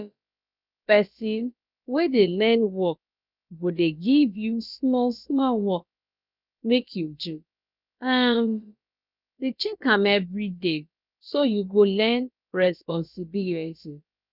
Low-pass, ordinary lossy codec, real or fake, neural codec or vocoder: 5.4 kHz; none; fake; codec, 16 kHz, about 1 kbps, DyCAST, with the encoder's durations